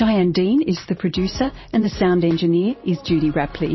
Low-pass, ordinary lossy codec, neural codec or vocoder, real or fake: 7.2 kHz; MP3, 24 kbps; vocoder, 44.1 kHz, 128 mel bands every 512 samples, BigVGAN v2; fake